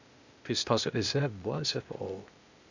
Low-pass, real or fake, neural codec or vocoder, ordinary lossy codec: 7.2 kHz; fake; codec, 16 kHz, 0.8 kbps, ZipCodec; none